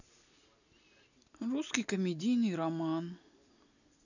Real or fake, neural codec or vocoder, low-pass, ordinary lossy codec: real; none; 7.2 kHz; none